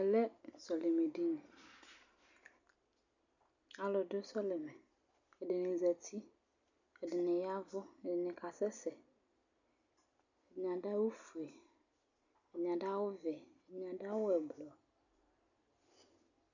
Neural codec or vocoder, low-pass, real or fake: none; 7.2 kHz; real